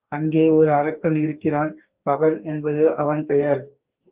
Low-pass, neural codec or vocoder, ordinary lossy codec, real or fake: 3.6 kHz; codec, 44.1 kHz, 2.6 kbps, DAC; Opus, 32 kbps; fake